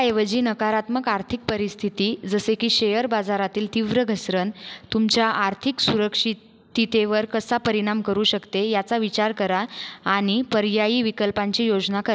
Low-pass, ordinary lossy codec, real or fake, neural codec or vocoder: none; none; real; none